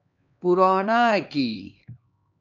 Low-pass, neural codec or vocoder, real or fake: 7.2 kHz; codec, 16 kHz, 2 kbps, X-Codec, HuBERT features, trained on LibriSpeech; fake